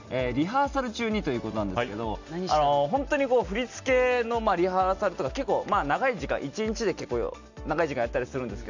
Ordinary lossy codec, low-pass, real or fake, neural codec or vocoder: none; 7.2 kHz; real; none